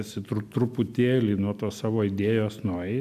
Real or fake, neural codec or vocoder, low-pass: real; none; 14.4 kHz